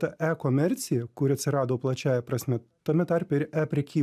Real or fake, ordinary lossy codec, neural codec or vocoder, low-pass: real; AAC, 96 kbps; none; 14.4 kHz